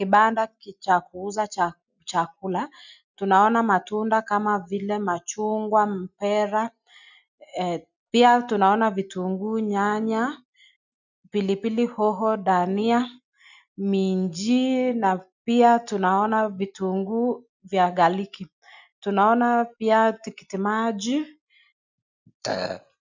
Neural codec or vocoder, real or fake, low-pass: none; real; 7.2 kHz